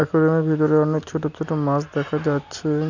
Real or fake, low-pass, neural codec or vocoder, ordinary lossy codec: real; 7.2 kHz; none; none